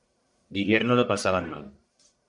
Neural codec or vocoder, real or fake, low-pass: codec, 44.1 kHz, 1.7 kbps, Pupu-Codec; fake; 10.8 kHz